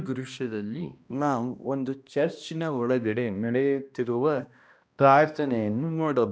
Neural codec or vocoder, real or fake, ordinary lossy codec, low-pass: codec, 16 kHz, 1 kbps, X-Codec, HuBERT features, trained on balanced general audio; fake; none; none